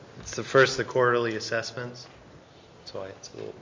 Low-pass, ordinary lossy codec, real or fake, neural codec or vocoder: 7.2 kHz; MP3, 48 kbps; real; none